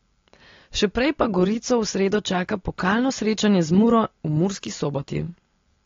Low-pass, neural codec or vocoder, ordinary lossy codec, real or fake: 7.2 kHz; none; AAC, 32 kbps; real